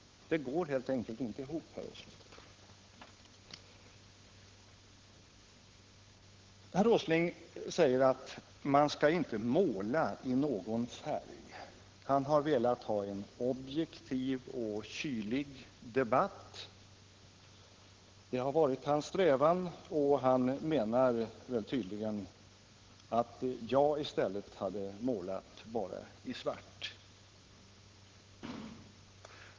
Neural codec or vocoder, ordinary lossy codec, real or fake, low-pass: codec, 16 kHz, 8 kbps, FunCodec, trained on Chinese and English, 25 frames a second; Opus, 16 kbps; fake; 7.2 kHz